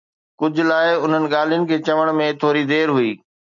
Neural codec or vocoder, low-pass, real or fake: none; 7.2 kHz; real